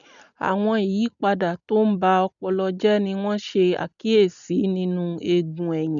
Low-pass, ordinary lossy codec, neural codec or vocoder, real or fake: 7.2 kHz; none; none; real